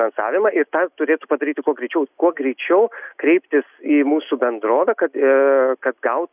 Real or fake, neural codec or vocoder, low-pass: real; none; 3.6 kHz